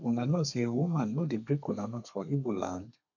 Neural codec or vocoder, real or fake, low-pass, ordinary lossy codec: codec, 32 kHz, 1.9 kbps, SNAC; fake; 7.2 kHz; none